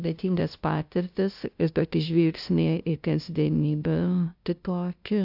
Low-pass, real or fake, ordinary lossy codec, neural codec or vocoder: 5.4 kHz; fake; AAC, 48 kbps; codec, 16 kHz, 0.5 kbps, FunCodec, trained on LibriTTS, 25 frames a second